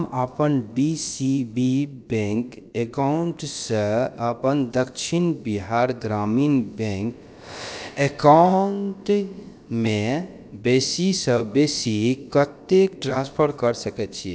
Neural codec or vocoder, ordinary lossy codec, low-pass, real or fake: codec, 16 kHz, about 1 kbps, DyCAST, with the encoder's durations; none; none; fake